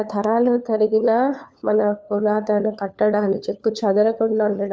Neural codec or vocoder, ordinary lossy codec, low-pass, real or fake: codec, 16 kHz, 4 kbps, FunCodec, trained on LibriTTS, 50 frames a second; none; none; fake